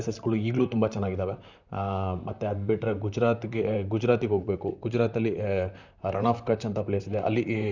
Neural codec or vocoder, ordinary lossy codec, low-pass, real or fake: vocoder, 44.1 kHz, 128 mel bands, Pupu-Vocoder; none; 7.2 kHz; fake